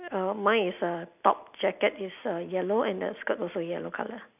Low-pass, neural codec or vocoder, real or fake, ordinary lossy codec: 3.6 kHz; none; real; none